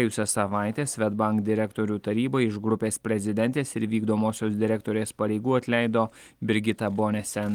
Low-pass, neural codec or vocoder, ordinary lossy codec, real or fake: 19.8 kHz; none; Opus, 24 kbps; real